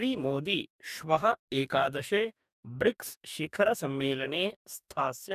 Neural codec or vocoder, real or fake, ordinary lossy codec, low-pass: codec, 44.1 kHz, 2.6 kbps, DAC; fake; none; 14.4 kHz